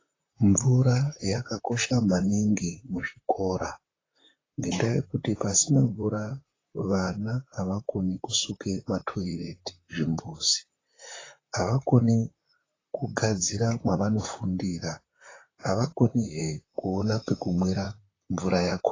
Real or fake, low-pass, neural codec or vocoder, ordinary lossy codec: fake; 7.2 kHz; vocoder, 44.1 kHz, 128 mel bands, Pupu-Vocoder; AAC, 32 kbps